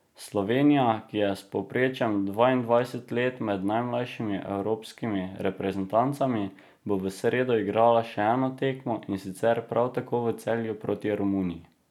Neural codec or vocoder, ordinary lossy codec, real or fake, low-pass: none; none; real; 19.8 kHz